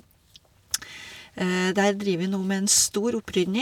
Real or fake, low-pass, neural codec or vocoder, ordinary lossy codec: real; 19.8 kHz; none; none